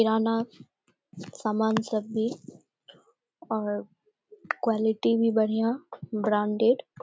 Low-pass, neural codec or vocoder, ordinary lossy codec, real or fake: none; none; none; real